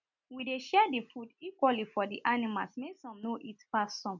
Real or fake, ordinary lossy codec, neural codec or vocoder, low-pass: real; none; none; 7.2 kHz